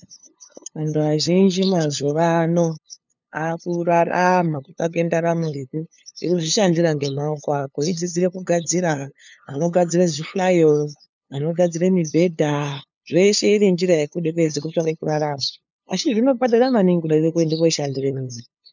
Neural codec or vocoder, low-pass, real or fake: codec, 16 kHz, 2 kbps, FunCodec, trained on LibriTTS, 25 frames a second; 7.2 kHz; fake